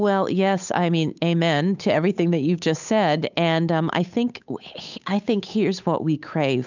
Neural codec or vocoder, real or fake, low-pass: codec, 16 kHz, 8 kbps, FunCodec, trained on Chinese and English, 25 frames a second; fake; 7.2 kHz